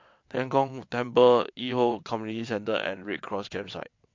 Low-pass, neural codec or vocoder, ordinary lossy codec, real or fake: 7.2 kHz; vocoder, 22.05 kHz, 80 mel bands, WaveNeXt; MP3, 48 kbps; fake